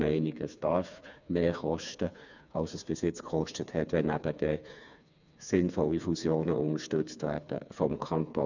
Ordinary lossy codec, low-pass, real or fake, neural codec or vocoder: none; 7.2 kHz; fake; codec, 16 kHz, 4 kbps, FreqCodec, smaller model